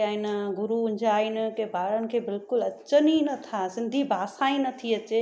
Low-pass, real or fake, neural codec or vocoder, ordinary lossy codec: none; real; none; none